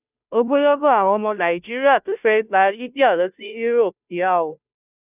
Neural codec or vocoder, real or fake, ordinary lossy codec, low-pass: codec, 16 kHz, 0.5 kbps, FunCodec, trained on Chinese and English, 25 frames a second; fake; none; 3.6 kHz